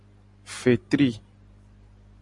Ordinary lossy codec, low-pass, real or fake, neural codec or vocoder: Opus, 64 kbps; 10.8 kHz; real; none